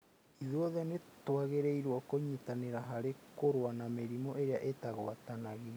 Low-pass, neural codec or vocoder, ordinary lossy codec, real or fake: none; none; none; real